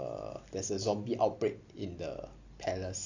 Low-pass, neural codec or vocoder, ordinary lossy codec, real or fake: 7.2 kHz; none; AAC, 48 kbps; real